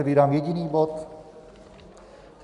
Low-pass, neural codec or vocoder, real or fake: 10.8 kHz; none; real